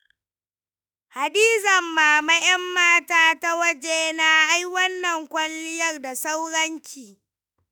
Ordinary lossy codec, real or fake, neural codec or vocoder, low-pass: none; fake; autoencoder, 48 kHz, 32 numbers a frame, DAC-VAE, trained on Japanese speech; none